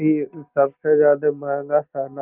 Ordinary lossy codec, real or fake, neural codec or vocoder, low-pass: Opus, 24 kbps; real; none; 3.6 kHz